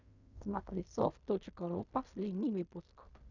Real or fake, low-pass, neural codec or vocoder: fake; 7.2 kHz; codec, 16 kHz in and 24 kHz out, 0.4 kbps, LongCat-Audio-Codec, fine tuned four codebook decoder